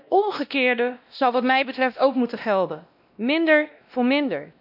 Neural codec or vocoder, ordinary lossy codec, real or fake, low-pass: codec, 16 kHz, 1 kbps, X-Codec, WavLM features, trained on Multilingual LibriSpeech; none; fake; 5.4 kHz